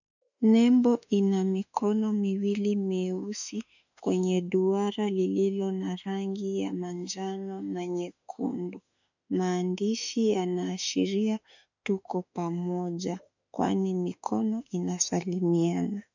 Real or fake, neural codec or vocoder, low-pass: fake; autoencoder, 48 kHz, 32 numbers a frame, DAC-VAE, trained on Japanese speech; 7.2 kHz